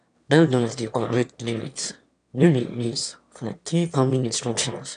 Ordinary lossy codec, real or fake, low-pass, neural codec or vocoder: none; fake; 9.9 kHz; autoencoder, 22.05 kHz, a latent of 192 numbers a frame, VITS, trained on one speaker